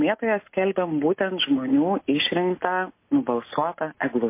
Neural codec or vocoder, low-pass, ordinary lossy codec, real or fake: none; 3.6 kHz; MP3, 24 kbps; real